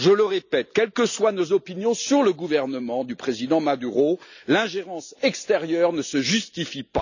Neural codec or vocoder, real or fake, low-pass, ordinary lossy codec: none; real; 7.2 kHz; none